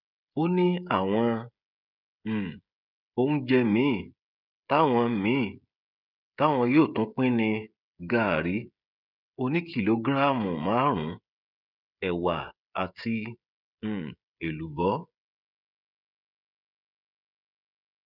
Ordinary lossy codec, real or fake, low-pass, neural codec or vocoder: none; fake; 5.4 kHz; codec, 16 kHz, 16 kbps, FreqCodec, smaller model